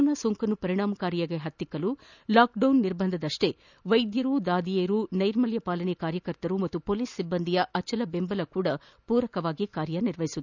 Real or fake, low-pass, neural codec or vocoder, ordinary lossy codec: real; 7.2 kHz; none; none